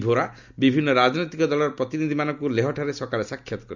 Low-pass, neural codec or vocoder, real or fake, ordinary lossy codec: 7.2 kHz; none; real; none